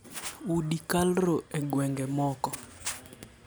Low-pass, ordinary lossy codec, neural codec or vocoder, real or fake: none; none; none; real